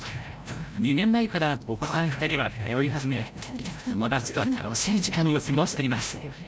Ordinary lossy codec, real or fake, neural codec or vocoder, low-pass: none; fake; codec, 16 kHz, 0.5 kbps, FreqCodec, larger model; none